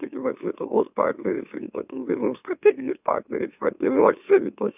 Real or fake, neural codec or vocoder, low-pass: fake; autoencoder, 44.1 kHz, a latent of 192 numbers a frame, MeloTTS; 3.6 kHz